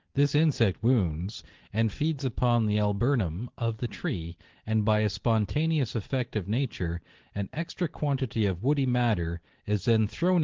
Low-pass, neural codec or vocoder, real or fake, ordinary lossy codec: 7.2 kHz; none; real; Opus, 16 kbps